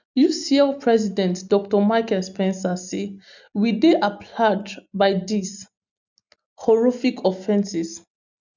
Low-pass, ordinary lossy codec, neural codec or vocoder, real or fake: 7.2 kHz; none; none; real